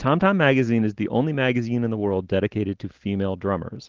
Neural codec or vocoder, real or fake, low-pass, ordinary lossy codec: none; real; 7.2 kHz; Opus, 16 kbps